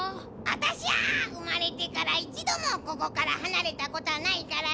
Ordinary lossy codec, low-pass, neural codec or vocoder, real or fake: none; none; none; real